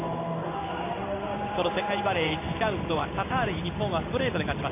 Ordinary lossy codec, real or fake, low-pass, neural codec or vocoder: MP3, 24 kbps; fake; 3.6 kHz; codec, 16 kHz in and 24 kHz out, 1 kbps, XY-Tokenizer